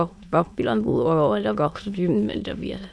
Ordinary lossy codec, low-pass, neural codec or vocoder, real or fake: none; none; autoencoder, 22.05 kHz, a latent of 192 numbers a frame, VITS, trained on many speakers; fake